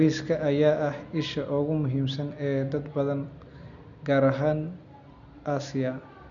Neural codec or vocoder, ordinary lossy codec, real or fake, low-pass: none; none; real; 7.2 kHz